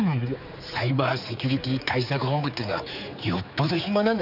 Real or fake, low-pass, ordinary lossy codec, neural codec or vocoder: fake; 5.4 kHz; none; codec, 16 kHz, 4 kbps, X-Codec, HuBERT features, trained on general audio